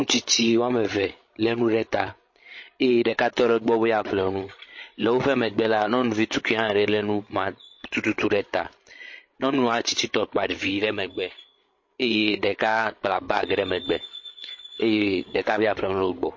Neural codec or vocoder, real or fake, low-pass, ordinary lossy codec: codec, 16 kHz, 16 kbps, FreqCodec, larger model; fake; 7.2 kHz; MP3, 32 kbps